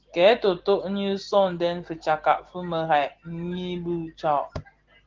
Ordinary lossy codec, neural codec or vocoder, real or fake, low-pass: Opus, 16 kbps; none; real; 7.2 kHz